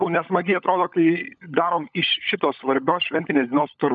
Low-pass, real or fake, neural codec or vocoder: 7.2 kHz; fake; codec, 16 kHz, 16 kbps, FunCodec, trained on LibriTTS, 50 frames a second